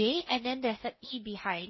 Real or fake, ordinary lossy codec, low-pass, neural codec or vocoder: fake; MP3, 24 kbps; 7.2 kHz; codec, 16 kHz in and 24 kHz out, 0.6 kbps, FocalCodec, streaming, 4096 codes